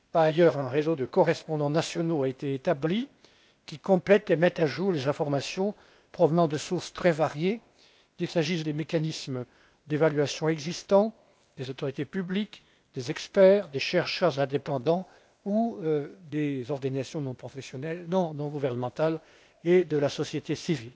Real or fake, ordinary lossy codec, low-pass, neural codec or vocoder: fake; none; none; codec, 16 kHz, 0.8 kbps, ZipCodec